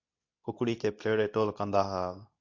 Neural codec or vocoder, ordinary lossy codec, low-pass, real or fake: codec, 24 kHz, 0.9 kbps, WavTokenizer, medium speech release version 2; AAC, 48 kbps; 7.2 kHz; fake